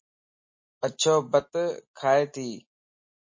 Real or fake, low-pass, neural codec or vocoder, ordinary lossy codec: real; 7.2 kHz; none; MP3, 32 kbps